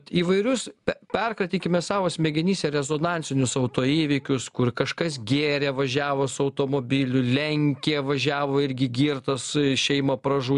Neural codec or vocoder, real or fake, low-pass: none; real; 10.8 kHz